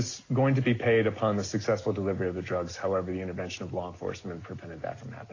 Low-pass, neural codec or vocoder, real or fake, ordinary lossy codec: 7.2 kHz; none; real; AAC, 32 kbps